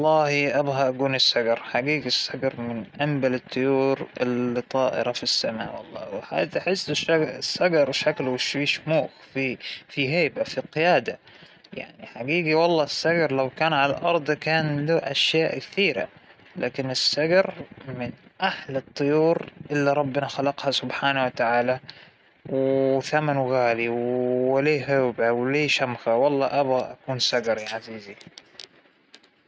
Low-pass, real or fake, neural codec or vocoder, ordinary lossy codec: none; real; none; none